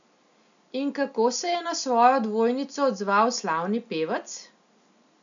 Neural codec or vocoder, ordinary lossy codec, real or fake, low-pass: none; none; real; 7.2 kHz